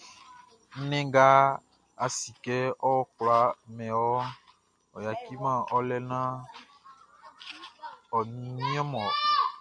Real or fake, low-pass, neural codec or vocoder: real; 9.9 kHz; none